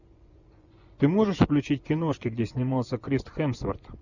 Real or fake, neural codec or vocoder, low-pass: fake; vocoder, 24 kHz, 100 mel bands, Vocos; 7.2 kHz